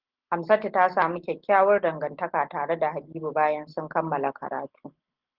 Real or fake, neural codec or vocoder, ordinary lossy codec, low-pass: real; none; Opus, 16 kbps; 5.4 kHz